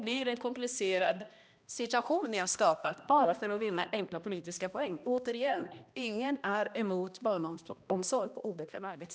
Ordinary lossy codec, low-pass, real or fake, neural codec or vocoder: none; none; fake; codec, 16 kHz, 1 kbps, X-Codec, HuBERT features, trained on balanced general audio